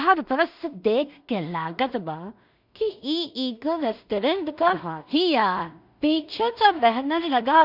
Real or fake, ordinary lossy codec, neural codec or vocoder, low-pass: fake; none; codec, 16 kHz in and 24 kHz out, 0.4 kbps, LongCat-Audio-Codec, two codebook decoder; 5.4 kHz